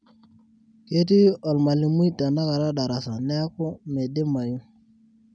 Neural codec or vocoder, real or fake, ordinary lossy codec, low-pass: none; real; none; 9.9 kHz